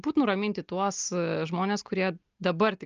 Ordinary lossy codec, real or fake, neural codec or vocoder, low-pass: Opus, 24 kbps; real; none; 7.2 kHz